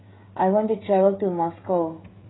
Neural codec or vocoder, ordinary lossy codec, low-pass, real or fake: codec, 16 kHz, 16 kbps, FreqCodec, smaller model; AAC, 16 kbps; 7.2 kHz; fake